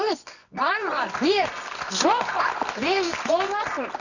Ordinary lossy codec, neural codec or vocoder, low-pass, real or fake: none; codec, 24 kHz, 0.9 kbps, WavTokenizer, medium music audio release; 7.2 kHz; fake